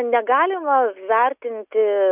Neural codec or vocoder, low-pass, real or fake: none; 3.6 kHz; real